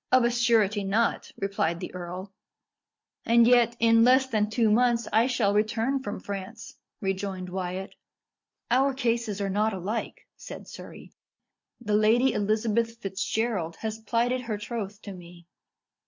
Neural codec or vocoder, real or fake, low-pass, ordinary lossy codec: none; real; 7.2 kHz; MP3, 64 kbps